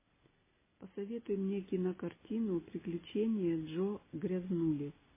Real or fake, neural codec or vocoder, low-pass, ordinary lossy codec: real; none; 3.6 kHz; MP3, 16 kbps